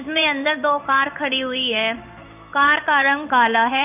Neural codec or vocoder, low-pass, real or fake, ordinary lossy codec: codec, 16 kHz in and 24 kHz out, 1 kbps, XY-Tokenizer; 3.6 kHz; fake; none